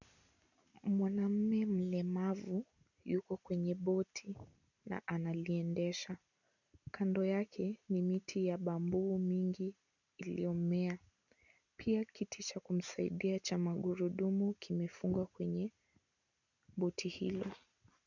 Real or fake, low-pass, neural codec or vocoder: real; 7.2 kHz; none